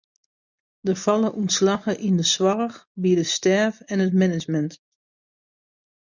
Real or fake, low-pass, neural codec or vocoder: real; 7.2 kHz; none